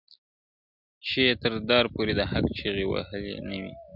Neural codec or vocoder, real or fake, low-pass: none; real; 5.4 kHz